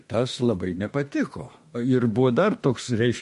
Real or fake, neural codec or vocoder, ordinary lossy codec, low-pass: fake; autoencoder, 48 kHz, 32 numbers a frame, DAC-VAE, trained on Japanese speech; MP3, 48 kbps; 14.4 kHz